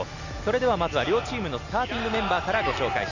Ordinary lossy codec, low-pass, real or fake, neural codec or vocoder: none; 7.2 kHz; real; none